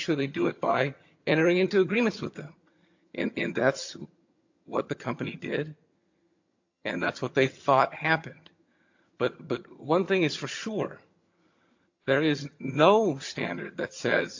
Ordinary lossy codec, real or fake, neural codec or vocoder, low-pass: AAC, 48 kbps; fake; vocoder, 22.05 kHz, 80 mel bands, HiFi-GAN; 7.2 kHz